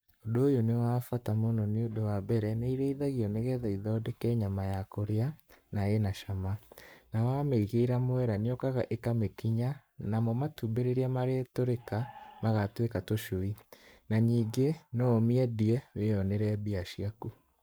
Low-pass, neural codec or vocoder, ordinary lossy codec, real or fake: none; codec, 44.1 kHz, 7.8 kbps, Pupu-Codec; none; fake